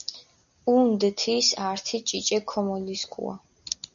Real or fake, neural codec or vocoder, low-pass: real; none; 7.2 kHz